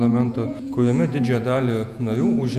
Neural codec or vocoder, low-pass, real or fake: vocoder, 48 kHz, 128 mel bands, Vocos; 14.4 kHz; fake